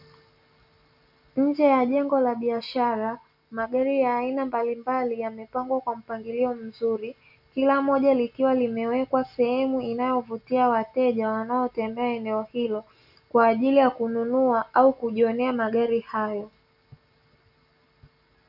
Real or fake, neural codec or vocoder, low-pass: real; none; 5.4 kHz